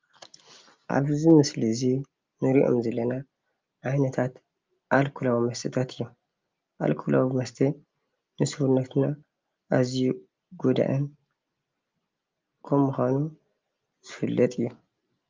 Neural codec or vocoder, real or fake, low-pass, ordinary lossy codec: none; real; 7.2 kHz; Opus, 32 kbps